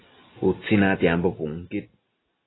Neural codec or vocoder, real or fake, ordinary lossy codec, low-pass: none; real; AAC, 16 kbps; 7.2 kHz